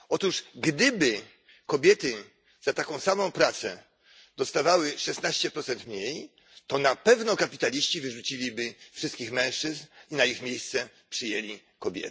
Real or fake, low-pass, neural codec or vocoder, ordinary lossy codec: real; none; none; none